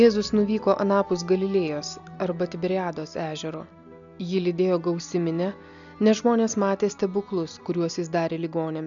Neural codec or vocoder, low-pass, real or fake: none; 7.2 kHz; real